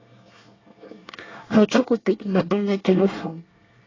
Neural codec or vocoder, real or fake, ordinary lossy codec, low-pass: codec, 24 kHz, 1 kbps, SNAC; fake; AAC, 32 kbps; 7.2 kHz